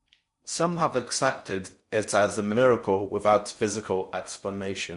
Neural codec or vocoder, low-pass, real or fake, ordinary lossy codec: codec, 16 kHz in and 24 kHz out, 0.6 kbps, FocalCodec, streaming, 4096 codes; 10.8 kHz; fake; MP3, 64 kbps